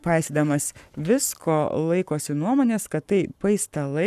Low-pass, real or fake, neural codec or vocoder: 14.4 kHz; fake; codec, 44.1 kHz, 7.8 kbps, Pupu-Codec